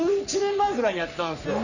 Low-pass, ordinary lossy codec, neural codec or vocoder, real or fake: 7.2 kHz; none; codec, 44.1 kHz, 3.4 kbps, Pupu-Codec; fake